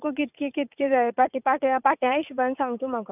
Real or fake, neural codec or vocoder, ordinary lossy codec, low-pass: fake; vocoder, 44.1 kHz, 80 mel bands, Vocos; none; 3.6 kHz